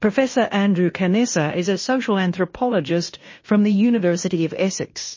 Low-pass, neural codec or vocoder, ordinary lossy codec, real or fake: 7.2 kHz; codec, 16 kHz in and 24 kHz out, 0.9 kbps, LongCat-Audio-Codec, fine tuned four codebook decoder; MP3, 32 kbps; fake